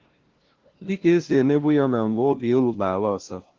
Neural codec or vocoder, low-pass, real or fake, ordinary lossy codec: codec, 16 kHz, 0.5 kbps, FunCodec, trained on LibriTTS, 25 frames a second; 7.2 kHz; fake; Opus, 16 kbps